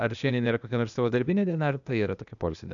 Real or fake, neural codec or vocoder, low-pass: fake; codec, 16 kHz, 0.8 kbps, ZipCodec; 7.2 kHz